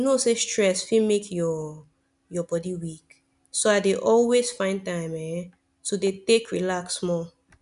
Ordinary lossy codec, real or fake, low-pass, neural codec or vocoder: none; real; 10.8 kHz; none